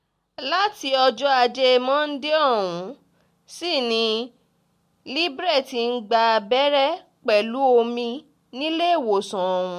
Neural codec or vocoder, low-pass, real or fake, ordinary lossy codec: none; 14.4 kHz; real; MP3, 64 kbps